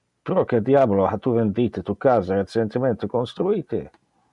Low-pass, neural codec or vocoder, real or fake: 10.8 kHz; none; real